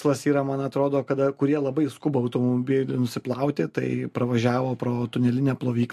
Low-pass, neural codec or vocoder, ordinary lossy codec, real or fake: 14.4 kHz; none; MP3, 64 kbps; real